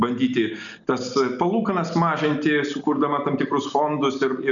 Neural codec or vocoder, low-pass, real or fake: none; 7.2 kHz; real